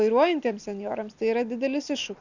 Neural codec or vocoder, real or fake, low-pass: none; real; 7.2 kHz